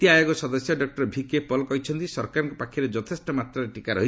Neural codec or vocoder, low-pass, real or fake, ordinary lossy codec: none; none; real; none